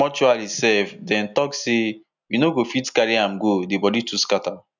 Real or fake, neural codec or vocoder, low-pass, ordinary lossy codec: real; none; 7.2 kHz; none